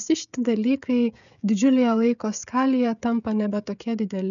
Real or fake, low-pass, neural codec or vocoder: fake; 7.2 kHz; codec, 16 kHz, 16 kbps, FreqCodec, smaller model